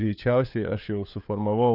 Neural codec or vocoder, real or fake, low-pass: codec, 16 kHz, 4 kbps, FunCodec, trained on LibriTTS, 50 frames a second; fake; 5.4 kHz